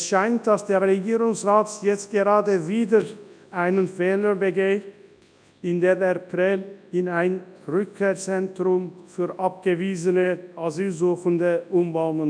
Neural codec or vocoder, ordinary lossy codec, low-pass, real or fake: codec, 24 kHz, 0.9 kbps, WavTokenizer, large speech release; none; 9.9 kHz; fake